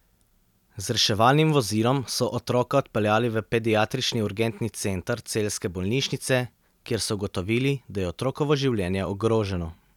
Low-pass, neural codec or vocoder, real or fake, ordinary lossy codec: 19.8 kHz; none; real; none